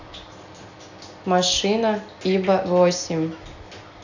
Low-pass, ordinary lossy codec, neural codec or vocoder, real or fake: 7.2 kHz; none; none; real